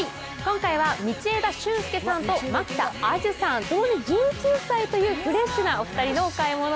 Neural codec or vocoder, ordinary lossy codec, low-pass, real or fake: none; none; none; real